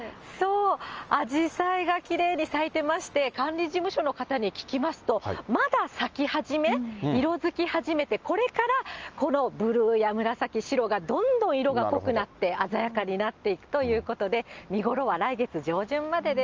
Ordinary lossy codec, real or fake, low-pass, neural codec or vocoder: Opus, 24 kbps; real; 7.2 kHz; none